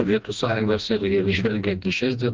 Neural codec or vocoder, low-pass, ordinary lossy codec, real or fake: codec, 16 kHz, 1 kbps, FreqCodec, smaller model; 7.2 kHz; Opus, 32 kbps; fake